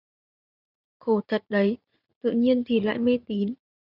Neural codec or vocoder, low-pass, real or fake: vocoder, 44.1 kHz, 80 mel bands, Vocos; 5.4 kHz; fake